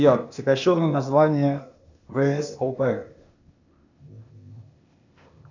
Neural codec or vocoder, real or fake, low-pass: codec, 16 kHz, 0.8 kbps, ZipCodec; fake; 7.2 kHz